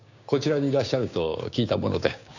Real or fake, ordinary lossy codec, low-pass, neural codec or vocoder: fake; none; 7.2 kHz; codec, 16 kHz, 6 kbps, DAC